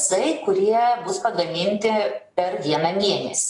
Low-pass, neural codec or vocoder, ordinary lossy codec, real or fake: 10.8 kHz; none; AAC, 32 kbps; real